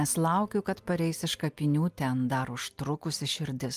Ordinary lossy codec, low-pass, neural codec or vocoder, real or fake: Opus, 32 kbps; 14.4 kHz; none; real